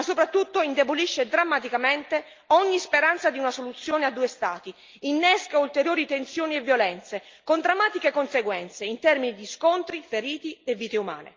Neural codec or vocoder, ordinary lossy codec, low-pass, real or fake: none; Opus, 24 kbps; 7.2 kHz; real